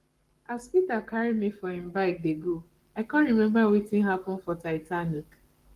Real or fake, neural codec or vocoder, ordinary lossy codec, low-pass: fake; codec, 44.1 kHz, 7.8 kbps, Pupu-Codec; Opus, 24 kbps; 14.4 kHz